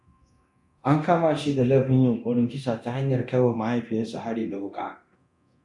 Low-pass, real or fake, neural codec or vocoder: 10.8 kHz; fake; codec, 24 kHz, 0.9 kbps, DualCodec